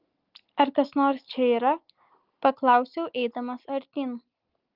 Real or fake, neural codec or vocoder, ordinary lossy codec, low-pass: real; none; Opus, 24 kbps; 5.4 kHz